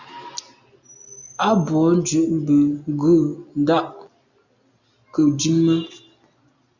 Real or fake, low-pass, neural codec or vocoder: real; 7.2 kHz; none